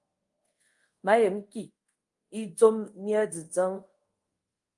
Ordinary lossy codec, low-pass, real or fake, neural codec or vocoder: Opus, 32 kbps; 10.8 kHz; fake; codec, 24 kHz, 0.5 kbps, DualCodec